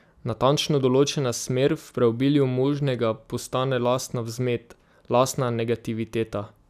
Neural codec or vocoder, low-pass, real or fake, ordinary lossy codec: none; 14.4 kHz; real; none